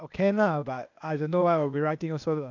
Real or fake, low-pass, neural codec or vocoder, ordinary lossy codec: fake; 7.2 kHz; codec, 16 kHz, 0.8 kbps, ZipCodec; none